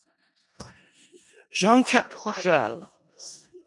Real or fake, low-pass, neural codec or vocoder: fake; 10.8 kHz; codec, 16 kHz in and 24 kHz out, 0.4 kbps, LongCat-Audio-Codec, four codebook decoder